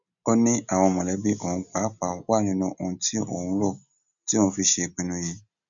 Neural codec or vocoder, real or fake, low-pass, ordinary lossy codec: none; real; 7.2 kHz; none